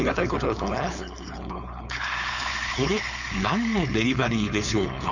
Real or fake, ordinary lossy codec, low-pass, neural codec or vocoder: fake; none; 7.2 kHz; codec, 16 kHz, 4.8 kbps, FACodec